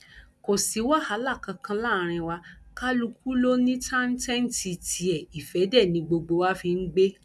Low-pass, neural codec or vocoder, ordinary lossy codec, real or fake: none; none; none; real